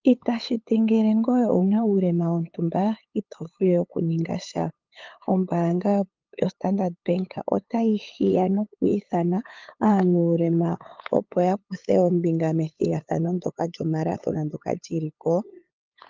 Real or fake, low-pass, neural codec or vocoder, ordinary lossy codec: fake; 7.2 kHz; codec, 16 kHz, 8 kbps, FunCodec, trained on LibriTTS, 25 frames a second; Opus, 32 kbps